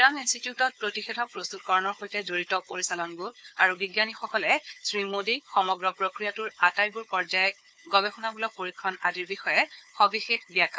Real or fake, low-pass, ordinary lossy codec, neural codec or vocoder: fake; none; none; codec, 16 kHz, 4 kbps, FunCodec, trained on Chinese and English, 50 frames a second